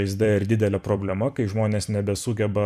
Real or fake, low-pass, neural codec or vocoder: fake; 14.4 kHz; vocoder, 44.1 kHz, 128 mel bands every 256 samples, BigVGAN v2